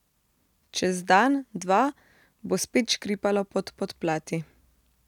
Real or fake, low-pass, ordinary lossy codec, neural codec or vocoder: real; 19.8 kHz; none; none